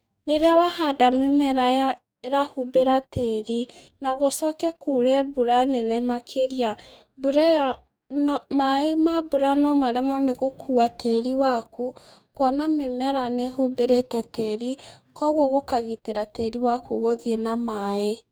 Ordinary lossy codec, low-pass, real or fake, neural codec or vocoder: none; none; fake; codec, 44.1 kHz, 2.6 kbps, DAC